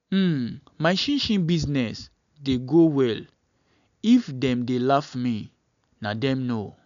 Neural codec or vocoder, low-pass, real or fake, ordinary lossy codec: none; 7.2 kHz; real; none